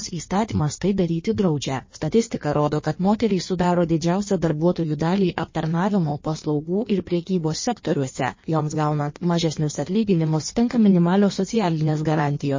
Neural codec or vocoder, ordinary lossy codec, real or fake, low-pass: codec, 16 kHz in and 24 kHz out, 1.1 kbps, FireRedTTS-2 codec; MP3, 32 kbps; fake; 7.2 kHz